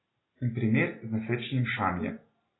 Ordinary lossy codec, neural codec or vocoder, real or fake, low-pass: AAC, 16 kbps; none; real; 7.2 kHz